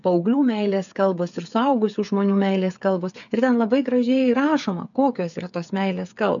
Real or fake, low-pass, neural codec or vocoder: fake; 7.2 kHz; codec, 16 kHz, 8 kbps, FreqCodec, smaller model